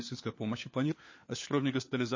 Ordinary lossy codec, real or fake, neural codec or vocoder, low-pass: MP3, 32 kbps; fake; codec, 16 kHz, 4 kbps, FunCodec, trained on LibriTTS, 50 frames a second; 7.2 kHz